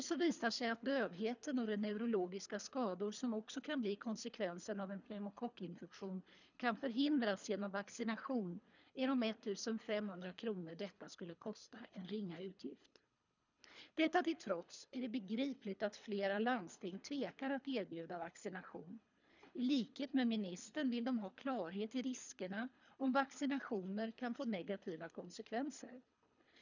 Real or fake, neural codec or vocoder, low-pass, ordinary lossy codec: fake; codec, 24 kHz, 3 kbps, HILCodec; 7.2 kHz; none